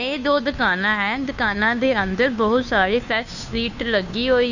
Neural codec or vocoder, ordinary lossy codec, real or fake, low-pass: codec, 16 kHz, 2 kbps, FunCodec, trained on Chinese and English, 25 frames a second; none; fake; 7.2 kHz